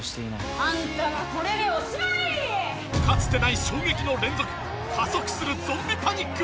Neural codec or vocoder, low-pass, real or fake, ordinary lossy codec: none; none; real; none